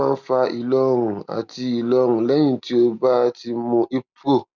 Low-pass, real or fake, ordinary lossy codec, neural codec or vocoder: 7.2 kHz; real; none; none